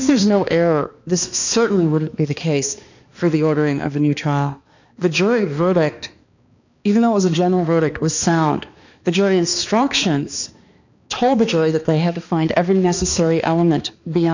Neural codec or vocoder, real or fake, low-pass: codec, 16 kHz, 2 kbps, X-Codec, HuBERT features, trained on balanced general audio; fake; 7.2 kHz